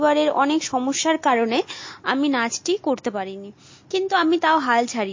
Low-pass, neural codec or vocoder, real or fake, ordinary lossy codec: 7.2 kHz; none; real; MP3, 32 kbps